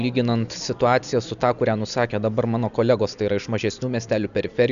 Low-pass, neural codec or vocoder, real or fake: 7.2 kHz; none; real